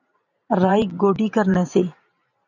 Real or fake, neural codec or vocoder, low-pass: fake; vocoder, 44.1 kHz, 128 mel bands every 512 samples, BigVGAN v2; 7.2 kHz